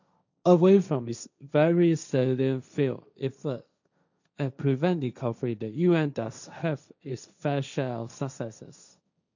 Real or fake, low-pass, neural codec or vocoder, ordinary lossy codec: fake; 7.2 kHz; codec, 16 kHz, 1.1 kbps, Voila-Tokenizer; none